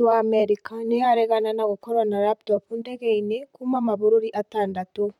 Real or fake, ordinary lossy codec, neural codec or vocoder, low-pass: fake; none; vocoder, 44.1 kHz, 128 mel bands, Pupu-Vocoder; 19.8 kHz